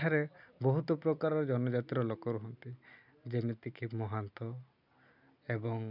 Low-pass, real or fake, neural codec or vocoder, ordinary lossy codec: 5.4 kHz; real; none; none